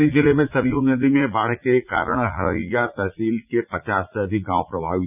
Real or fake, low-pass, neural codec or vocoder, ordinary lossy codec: fake; 3.6 kHz; vocoder, 44.1 kHz, 80 mel bands, Vocos; none